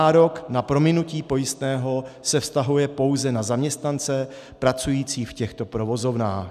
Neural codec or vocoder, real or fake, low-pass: none; real; 14.4 kHz